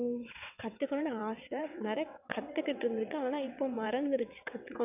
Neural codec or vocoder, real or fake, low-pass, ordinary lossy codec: vocoder, 22.05 kHz, 80 mel bands, WaveNeXt; fake; 3.6 kHz; none